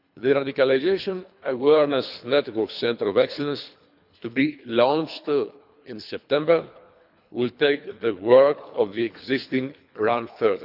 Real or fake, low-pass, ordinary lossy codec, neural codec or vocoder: fake; 5.4 kHz; none; codec, 24 kHz, 3 kbps, HILCodec